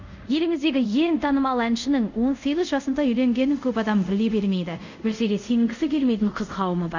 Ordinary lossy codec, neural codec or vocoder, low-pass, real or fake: none; codec, 24 kHz, 0.5 kbps, DualCodec; 7.2 kHz; fake